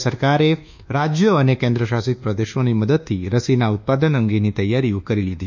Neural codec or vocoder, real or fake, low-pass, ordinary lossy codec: codec, 24 kHz, 1.2 kbps, DualCodec; fake; 7.2 kHz; none